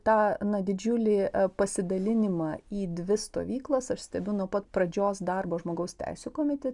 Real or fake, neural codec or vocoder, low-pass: real; none; 10.8 kHz